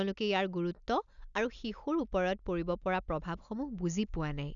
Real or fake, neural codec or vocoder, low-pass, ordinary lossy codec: real; none; 7.2 kHz; none